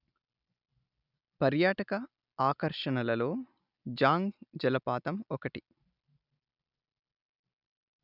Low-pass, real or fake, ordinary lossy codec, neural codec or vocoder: 5.4 kHz; real; none; none